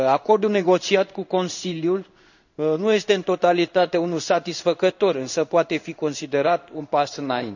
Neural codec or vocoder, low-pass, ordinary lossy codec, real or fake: codec, 16 kHz in and 24 kHz out, 1 kbps, XY-Tokenizer; 7.2 kHz; none; fake